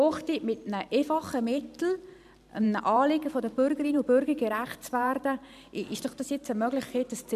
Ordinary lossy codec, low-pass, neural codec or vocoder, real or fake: none; 14.4 kHz; vocoder, 44.1 kHz, 128 mel bands every 512 samples, BigVGAN v2; fake